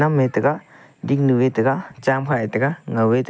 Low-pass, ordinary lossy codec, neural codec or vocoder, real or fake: none; none; none; real